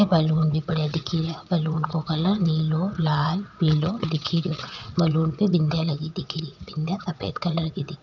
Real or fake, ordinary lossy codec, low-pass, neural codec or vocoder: fake; none; 7.2 kHz; vocoder, 22.05 kHz, 80 mel bands, WaveNeXt